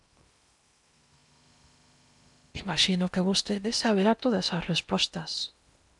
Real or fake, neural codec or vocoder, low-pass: fake; codec, 16 kHz in and 24 kHz out, 0.8 kbps, FocalCodec, streaming, 65536 codes; 10.8 kHz